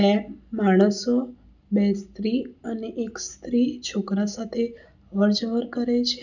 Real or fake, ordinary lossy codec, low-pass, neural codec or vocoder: real; none; 7.2 kHz; none